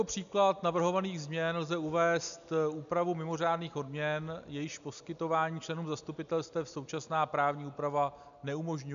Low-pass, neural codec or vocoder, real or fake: 7.2 kHz; none; real